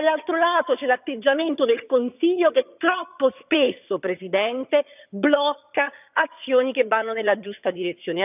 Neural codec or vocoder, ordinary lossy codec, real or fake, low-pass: vocoder, 22.05 kHz, 80 mel bands, HiFi-GAN; none; fake; 3.6 kHz